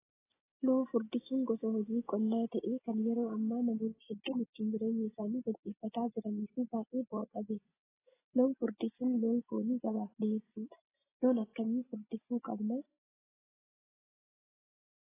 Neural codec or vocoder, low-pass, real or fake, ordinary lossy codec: none; 3.6 kHz; real; AAC, 16 kbps